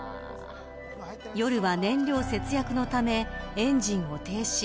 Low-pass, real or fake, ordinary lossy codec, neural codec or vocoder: none; real; none; none